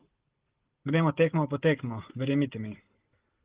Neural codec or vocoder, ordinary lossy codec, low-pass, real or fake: none; Opus, 16 kbps; 3.6 kHz; real